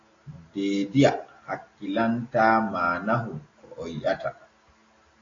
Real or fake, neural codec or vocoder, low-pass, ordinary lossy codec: real; none; 7.2 kHz; AAC, 32 kbps